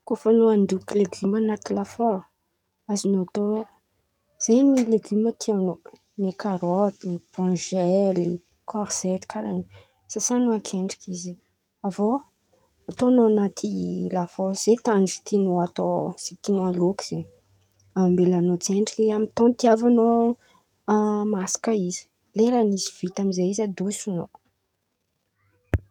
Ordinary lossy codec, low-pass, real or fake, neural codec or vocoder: none; 19.8 kHz; fake; codec, 44.1 kHz, 7.8 kbps, DAC